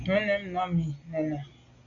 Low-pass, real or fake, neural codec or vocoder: 7.2 kHz; real; none